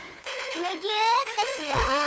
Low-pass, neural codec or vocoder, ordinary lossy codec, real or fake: none; codec, 16 kHz, 4 kbps, FunCodec, trained on LibriTTS, 50 frames a second; none; fake